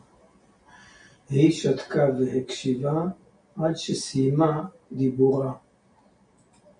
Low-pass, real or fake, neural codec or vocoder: 9.9 kHz; real; none